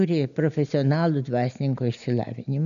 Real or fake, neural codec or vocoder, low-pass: real; none; 7.2 kHz